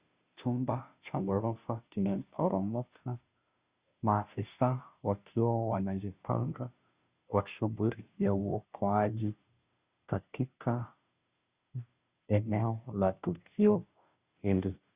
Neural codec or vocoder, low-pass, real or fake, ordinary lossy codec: codec, 16 kHz, 0.5 kbps, FunCodec, trained on Chinese and English, 25 frames a second; 3.6 kHz; fake; Opus, 64 kbps